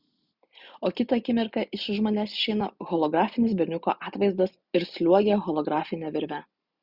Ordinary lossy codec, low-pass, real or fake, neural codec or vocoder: Opus, 64 kbps; 5.4 kHz; real; none